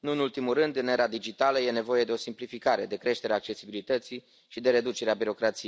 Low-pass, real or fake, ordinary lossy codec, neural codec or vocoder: none; real; none; none